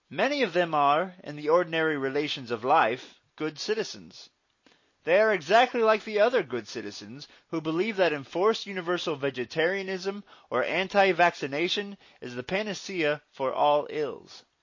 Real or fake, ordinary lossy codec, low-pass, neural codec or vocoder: real; MP3, 32 kbps; 7.2 kHz; none